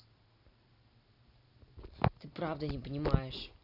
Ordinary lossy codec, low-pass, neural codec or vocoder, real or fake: none; 5.4 kHz; none; real